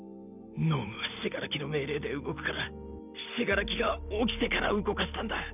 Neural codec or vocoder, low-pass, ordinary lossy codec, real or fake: none; 3.6 kHz; none; real